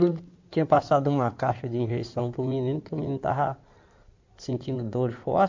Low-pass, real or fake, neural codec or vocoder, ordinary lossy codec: 7.2 kHz; fake; codec, 16 kHz in and 24 kHz out, 2.2 kbps, FireRedTTS-2 codec; MP3, 48 kbps